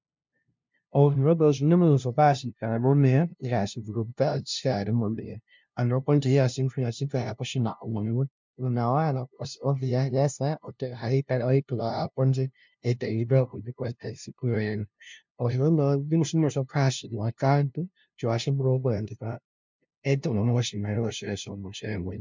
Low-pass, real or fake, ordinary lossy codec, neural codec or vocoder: 7.2 kHz; fake; MP3, 64 kbps; codec, 16 kHz, 0.5 kbps, FunCodec, trained on LibriTTS, 25 frames a second